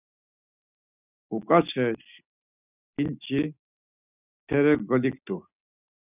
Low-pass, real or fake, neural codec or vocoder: 3.6 kHz; real; none